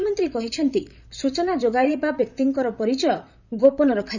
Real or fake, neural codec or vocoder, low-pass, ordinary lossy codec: fake; vocoder, 44.1 kHz, 128 mel bands, Pupu-Vocoder; 7.2 kHz; none